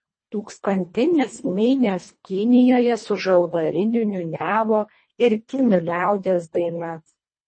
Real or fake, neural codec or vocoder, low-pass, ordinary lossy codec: fake; codec, 24 kHz, 1.5 kbps, HILCodec; 9.9 kHz; MP3, 32 kbps